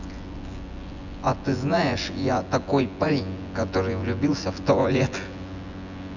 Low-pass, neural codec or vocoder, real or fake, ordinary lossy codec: 7.2 kHz; vocoder, 24 kHz, 100 mel bands, Vocos; fake; none